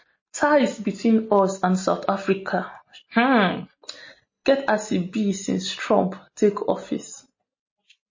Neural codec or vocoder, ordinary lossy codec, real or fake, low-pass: none; MP3, 32 kbps; real; 7.2 kHz